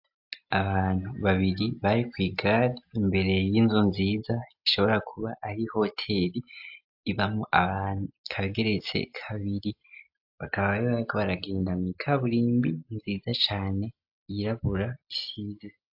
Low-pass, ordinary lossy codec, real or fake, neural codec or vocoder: 5.4 kHz; AAC, 48 kbps; real; none